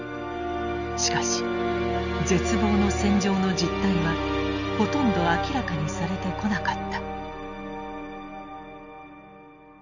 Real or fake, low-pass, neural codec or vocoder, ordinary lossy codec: real; 7.2 kHz; none; none